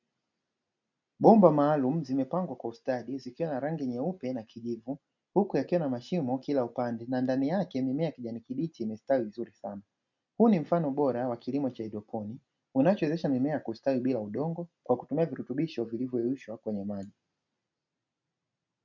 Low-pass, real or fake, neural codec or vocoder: 7.2 kHz; real; none